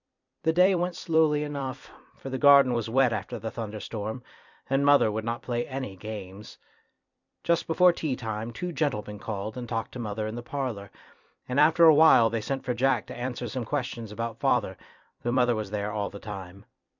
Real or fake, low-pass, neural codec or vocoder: fake; 7.2 kHz; vocoder, 44.1 kHz, 128 mel bands every 256 samples, BigVGAN v2